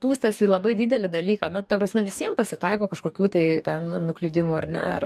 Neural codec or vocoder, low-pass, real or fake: codec, 44.1 kHz, 2.6 kbps, DAC; 14.4 kHz; fake